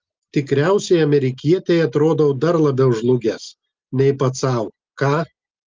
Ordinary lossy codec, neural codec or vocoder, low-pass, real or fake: Opus, 24 kbps; none; 7.2 kHz; real